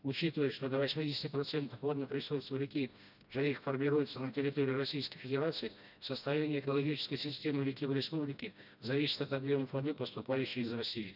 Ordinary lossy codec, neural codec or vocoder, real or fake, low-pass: none; codec, 16 kHz, 1 kbps, FreqCodec, smaller model; fake; 5.4 kHz